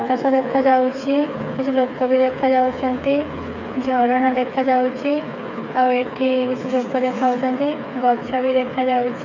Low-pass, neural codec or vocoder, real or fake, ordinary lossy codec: 7.2 kHz; codec, 16 kHz, 4 kbps, FreqCodec, smaller model; fake; none